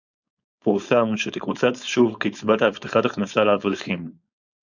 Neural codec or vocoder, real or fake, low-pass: codec, 16 kHz, 4.8 kbps, FACodec; fake; 7.2 kHz